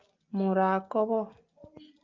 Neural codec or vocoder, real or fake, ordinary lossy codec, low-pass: none; real; Opus, 24 kbps; 7.2 kHz